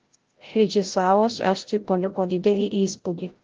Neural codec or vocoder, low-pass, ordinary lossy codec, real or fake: codec, 16 kHz, 0.5 kbps, FreqCodec, larger model; 7.2 kHz; Opus, 16 kbps; fake